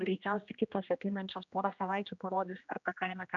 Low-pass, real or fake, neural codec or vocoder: 7.2 kHz; fake; codec, 16 kHz, 1 kbps, X-Codec, HuBERT features, trained on general audio